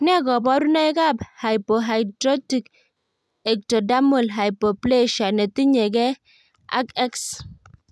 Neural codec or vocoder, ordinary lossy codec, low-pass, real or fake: none; none; none; real